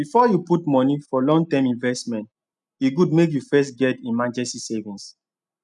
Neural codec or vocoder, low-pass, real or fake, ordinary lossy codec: none; 10.8 kHz; real; none